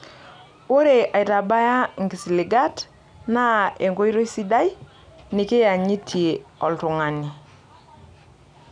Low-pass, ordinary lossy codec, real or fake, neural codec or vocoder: 9.9 kHz; none; real; none